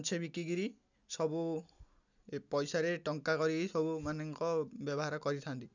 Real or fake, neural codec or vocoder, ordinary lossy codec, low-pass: real; none; none; 7.2 kHz